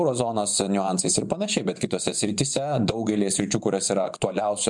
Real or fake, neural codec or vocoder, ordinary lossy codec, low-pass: fake; vocoder, 24 kHz, 100 mel bands, Vocos; MP3, 96 kbps; 10.8 kHz